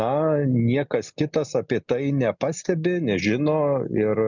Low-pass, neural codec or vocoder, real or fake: 7.2 kHz; none; real